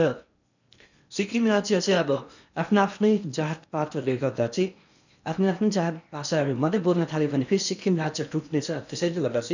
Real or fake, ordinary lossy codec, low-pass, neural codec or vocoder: fake; none; 7.2 kHz; codec, 16 kHz in and 24 kHz out, 0.8 kbps, FocalCodec, streaming, 65536 codes